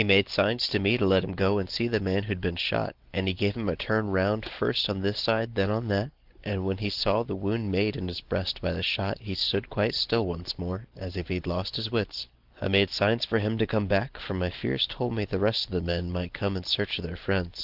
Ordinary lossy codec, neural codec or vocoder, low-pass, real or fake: Opus, 16 kbps; none; 5.4 kHz; real